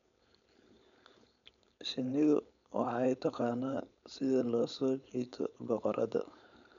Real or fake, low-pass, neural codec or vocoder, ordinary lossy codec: fake; 7.2 kHz; codec, 16 kHz, 4.8 kbps, FACodec; none